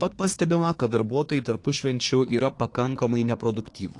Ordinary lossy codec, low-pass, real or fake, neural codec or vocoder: AAC, 48 kbps; 10.8 kHz; fake; codec, 24 kHz, 1 kbps, SNAC